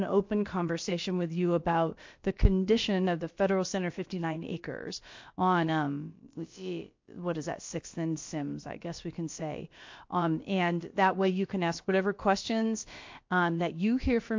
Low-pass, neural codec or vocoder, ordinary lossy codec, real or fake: 7.2 kHz; codec, 16 kHz, about 1 kbps, DyCAST, with the encoder's durations; MP3, 48 kbps; fake